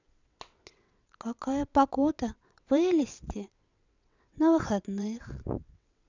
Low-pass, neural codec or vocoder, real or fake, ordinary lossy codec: 7.2 kHz; vocoder, 22.05 kHz, 80 mel bands, WaveNeXt; fake; none